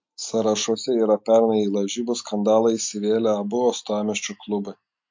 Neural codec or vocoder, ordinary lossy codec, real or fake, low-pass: none; MP3, 48 kbps; real; 7.2 kHz